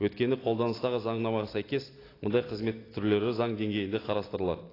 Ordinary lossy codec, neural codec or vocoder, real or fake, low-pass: AAC, 24 kbps; none; real; 5.4 kHz